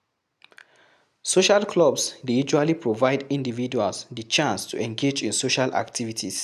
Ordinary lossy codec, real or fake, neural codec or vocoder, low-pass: none; real; none; 14.4 kHz